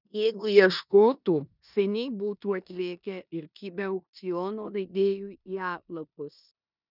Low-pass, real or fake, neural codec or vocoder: 5.4 kHz; fake; codec, 16 kHz in and 24 kHz out, 0.9 kbps, LongCat-Audio-Codec, four codebook decoder